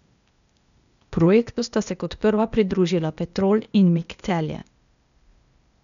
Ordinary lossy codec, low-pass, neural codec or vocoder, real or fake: none; 7.2 kHz; codec, 16 kHz, 0.8 kbps, ZipCodec; fake